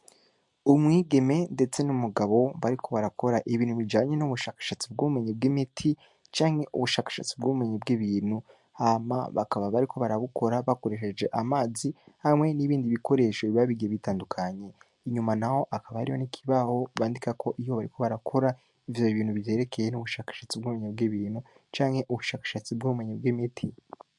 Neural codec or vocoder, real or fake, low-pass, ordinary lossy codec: none; real; 10.8 kHz; MP3, 64 kbps